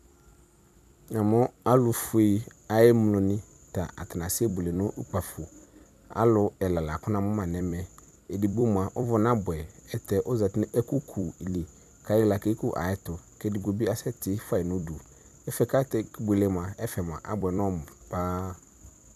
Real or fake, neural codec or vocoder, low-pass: fake; vocoder, 44.1 kHz, 128 mel bands every 256 samples, BigVGAN v2; 14.4 kHz